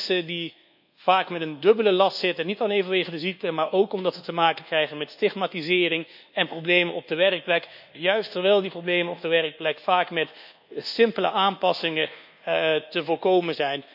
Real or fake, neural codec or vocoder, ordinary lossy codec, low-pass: fake; codec, 24 kHz, 1.2 kbps, DualCodec; none; 5.4 kHz